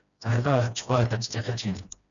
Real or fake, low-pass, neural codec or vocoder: fake; 7.2 kHz; codec, 16 kHz, 1 kbps, FreqCodec, smaller model